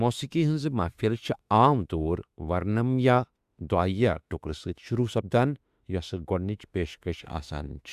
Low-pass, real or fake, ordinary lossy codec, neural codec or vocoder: 14.4 kHz; fake; Opus, 64 kbps; autoencoder, 48 kHz, 32 numbers a frame, DAC-VAE, trained on Japanese speech